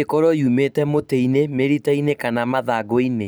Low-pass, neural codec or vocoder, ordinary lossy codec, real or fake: none; none; none; real